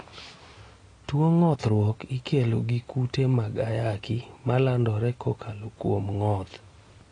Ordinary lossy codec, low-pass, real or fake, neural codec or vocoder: AAC, 32 kbps; 9.9 kHz; real; none